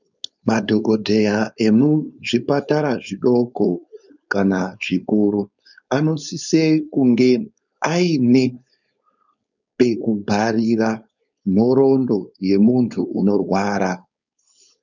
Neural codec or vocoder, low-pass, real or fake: codec, 16 kHz, 4.8 kbps, FACodec; 7.2 kHz; fake